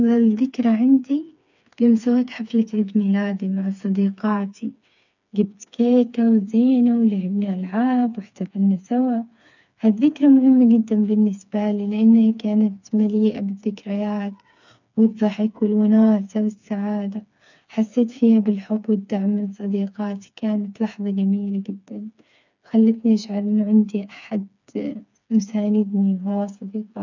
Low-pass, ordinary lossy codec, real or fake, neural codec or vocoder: 7.2 kHz; none; fake; codec, 16 kHz, 4 kbps, FreqCodec, smaller model